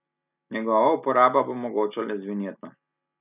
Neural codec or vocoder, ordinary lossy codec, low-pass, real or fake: none; none; 3.6 kHz; real